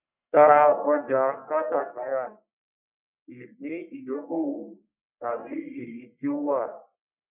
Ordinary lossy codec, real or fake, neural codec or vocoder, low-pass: none; fake; codec, 44.1 kHz, 1.7 kbps, Pupu-Codec; 3.6 kHz